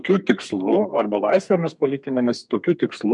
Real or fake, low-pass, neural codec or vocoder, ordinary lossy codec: fake; 10.8 kHz; codec, 32 kHz, 1.9 kbps, SNAC; MP3, 64 kbps